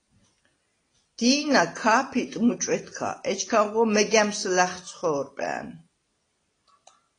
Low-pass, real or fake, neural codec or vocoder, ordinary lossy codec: 9.9 kHz; real; none; AAC, 32 kbps